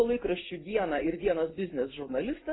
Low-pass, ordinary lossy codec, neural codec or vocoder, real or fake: 7.2 kHz; AAC, 16 kbps; none; real